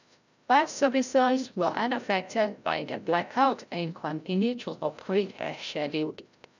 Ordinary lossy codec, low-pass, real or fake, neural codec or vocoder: none; 7.2 kHz; fake; codec, 16 kHz, 0.5 kbps, FreqCodec, larger model